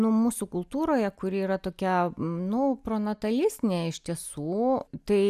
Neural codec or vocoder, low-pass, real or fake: none; 14.4 kHz; real